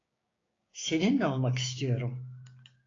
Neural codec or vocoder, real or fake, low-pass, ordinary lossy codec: codec, 16 kHz, 6 kbps, DAC; fake; 7.2 kHz; AAC, 32 kbps